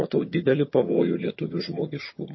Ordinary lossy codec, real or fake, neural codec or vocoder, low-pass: MP3, 24 kbps; fake; vocoder, 22.05 kHz, 80 mel bands, HiFi-GAN; 7.2 kHz